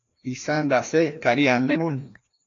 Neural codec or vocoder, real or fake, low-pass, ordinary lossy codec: codec, 16 kHz, 1 kbps, FreqCodec, larger model; fake; 7.2 kHz; AAC, 48 kbps